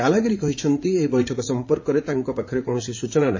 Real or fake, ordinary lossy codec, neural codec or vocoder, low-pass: fake; MP3, 32 kbps; vocoder, 44.1 kHz, 80 mel bands, Vocos; 7.2 kHz